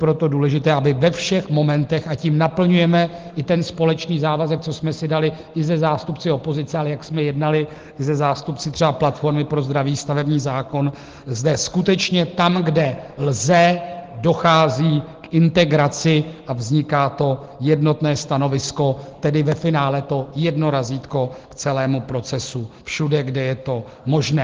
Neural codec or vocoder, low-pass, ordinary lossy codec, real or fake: none; 7.2 kHz; Opus, 16 kbps; real